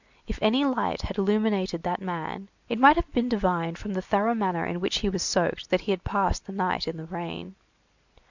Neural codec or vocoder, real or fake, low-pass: none; real; 7.2 kHz